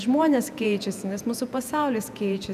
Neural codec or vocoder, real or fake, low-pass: vocoder, 48 kHz, 128 mel bands, Vocos; fake; 14.4 kHz